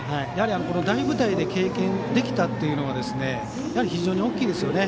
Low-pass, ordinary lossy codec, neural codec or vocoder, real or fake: none; none; none; real